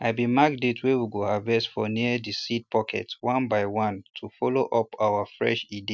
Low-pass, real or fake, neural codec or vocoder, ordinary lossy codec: none; real; none; none